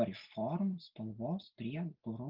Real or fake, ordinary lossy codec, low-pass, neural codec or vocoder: real; Opus, 24 kbps; 5.4 kHz; none